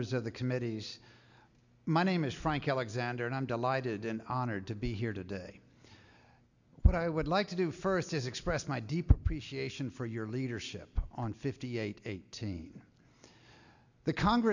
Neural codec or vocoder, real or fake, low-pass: none; real; 7.2 kHz